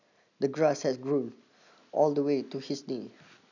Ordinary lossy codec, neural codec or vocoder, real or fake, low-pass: none; none; real; 7.2 kHz